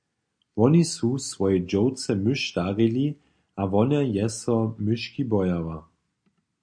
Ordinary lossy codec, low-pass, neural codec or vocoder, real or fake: MP3, 48 kbps; 9.9 kHz; none; real